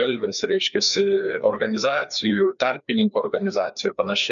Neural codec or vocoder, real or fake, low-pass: codec, 16 kHz, 2 kbps, FreqCodec, larger model; fake; 7.2 kHz